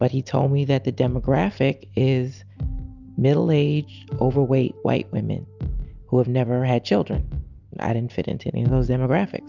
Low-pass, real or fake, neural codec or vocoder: 7.2 kHz; real; none